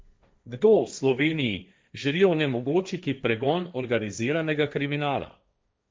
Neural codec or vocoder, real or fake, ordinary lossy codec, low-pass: codec, 16 kHz, 1.1 kbps, Voila-Tokenizer; fake; none; none